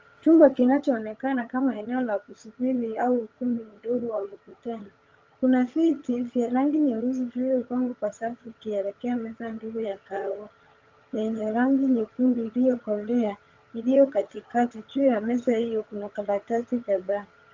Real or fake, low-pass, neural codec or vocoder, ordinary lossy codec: fake; 7.2 kHz; vocoder, 22.05 kHz, 80 mel bands, HiFi-GAN; Opus, 24 kbps